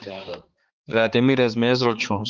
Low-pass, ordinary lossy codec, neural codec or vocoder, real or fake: 7.2 kHz; Opus, 16 kbps; codec, 16 kHz, 4 kbps, X-Codec, HuBERT features, trained on balanced general audio; fake